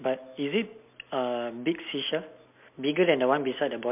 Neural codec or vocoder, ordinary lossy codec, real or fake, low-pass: none; MP3, 32 kbps; real; 3.6 kHz